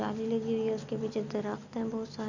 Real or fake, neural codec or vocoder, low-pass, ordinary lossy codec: real; none; 7.2 kHz; none